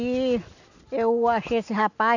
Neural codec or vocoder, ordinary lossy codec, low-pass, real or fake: none; none; 7.2 kHz; real